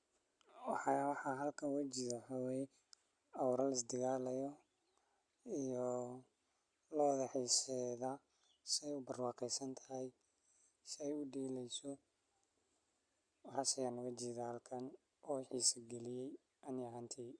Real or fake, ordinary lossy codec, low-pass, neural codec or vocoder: real; Opus, 64 kbps; 9.9 kHz; none